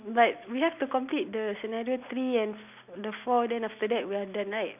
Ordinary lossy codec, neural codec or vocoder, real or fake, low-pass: none; none; real; 3.6 kHz